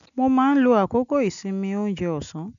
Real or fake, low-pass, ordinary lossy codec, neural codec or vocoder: real; 7.2 kHz; none; none